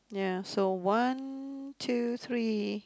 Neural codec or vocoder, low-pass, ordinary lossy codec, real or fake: none; none; none; real